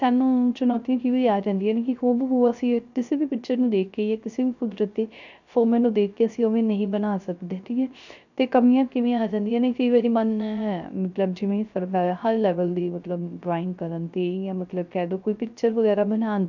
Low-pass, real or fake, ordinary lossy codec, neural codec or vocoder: 7.2 kHz; fake; none; codec, 16 kHz, 0.3 kbps, FocalCodec